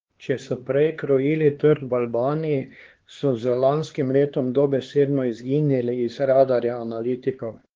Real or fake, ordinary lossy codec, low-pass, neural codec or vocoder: fake; Opus, 16 kbps; 7.2 kHz; codec, 16 kHz, 2 kbps, X-Codec, HuBERT features, trained on LibriSpeech